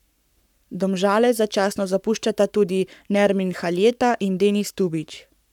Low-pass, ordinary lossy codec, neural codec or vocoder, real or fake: 19.8 kHz; none; codec, 44.1 kHz, 7.8 kbps, Pupu-Codec; fake